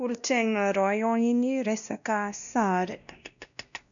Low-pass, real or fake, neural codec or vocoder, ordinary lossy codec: 7.2 kHz; fake; codec, 16 kHz, 1 kbps, X-Codec, WavLM features, trained on Multilingual LibriSpeech; none